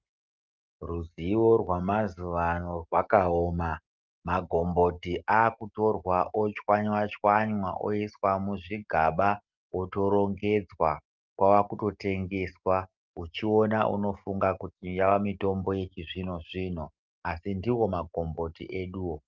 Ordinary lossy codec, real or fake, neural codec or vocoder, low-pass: Opus, 32 kbps; real; none; 7.2 kHz